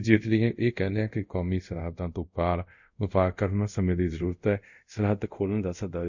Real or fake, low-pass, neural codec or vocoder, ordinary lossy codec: fake; 7.2 kHz; codec, 24 kHz, 0.5 kbps, DualCodec; none